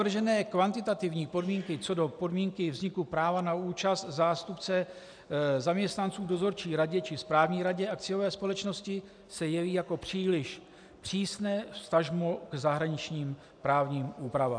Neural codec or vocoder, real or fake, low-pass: none; real; 9.9 kHz